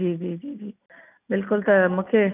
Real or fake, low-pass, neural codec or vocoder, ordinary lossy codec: real; 3.6 kHz; none; none